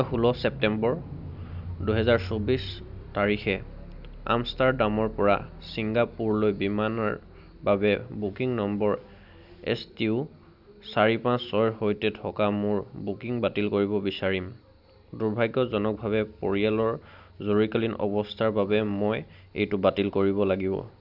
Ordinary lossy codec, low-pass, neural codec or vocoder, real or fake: none; 5.4 kHz; none; real